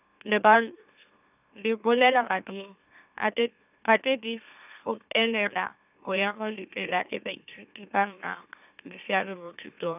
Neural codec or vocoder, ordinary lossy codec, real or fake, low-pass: autoencoder, 44.1 kHz, a latent of 192 numbers a frame, MeloTTS; none; fake; 3.6 kHz